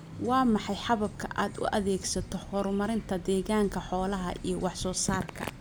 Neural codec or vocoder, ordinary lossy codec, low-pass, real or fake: none; none; none; real